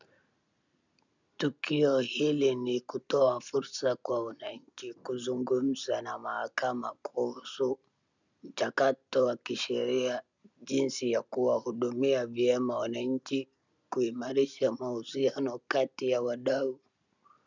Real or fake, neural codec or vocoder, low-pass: real; none; 7.2 kHz